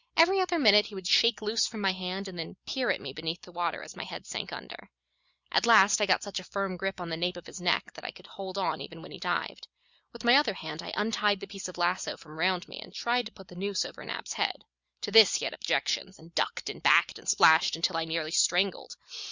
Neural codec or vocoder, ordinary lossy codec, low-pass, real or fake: none; Opus, 64 kbps; 7.2 kHz; real